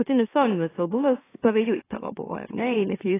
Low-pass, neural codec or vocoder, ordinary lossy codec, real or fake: 3.6 kHz; autoencoder, 44.1 kHz, a latent of 192 numbers a frame, MeloTTS; AAC, 16 kbps; fake